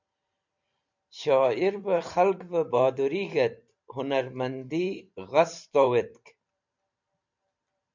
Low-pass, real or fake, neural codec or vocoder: 7.2 kHz; fake; vocoder, 44.1 kHz, 128 mel bands every 512 samples, BigVGAN v2